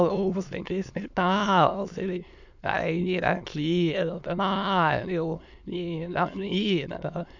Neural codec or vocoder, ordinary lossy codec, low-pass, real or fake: autoencoder, 22.05 kHz, a latent of 192 numbers a frame, VITS, trained on many speakers; none; 7.2 kHz; fake